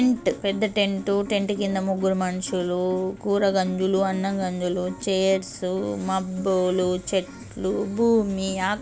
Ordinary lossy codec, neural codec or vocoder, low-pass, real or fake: none; none; none; real